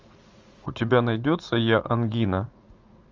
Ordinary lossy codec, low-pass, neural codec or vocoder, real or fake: Opus, 32 kbps; 7.2 kHz; vocoder, 44.1 kHz, 80 mel bands, Vocos; fake